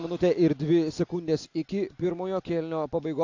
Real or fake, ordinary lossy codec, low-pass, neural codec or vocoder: real; AAC, 48 kbps; 7.2 kHz; none